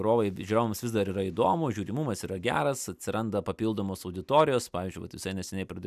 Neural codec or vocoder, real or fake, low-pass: none; real; 14.4 kHz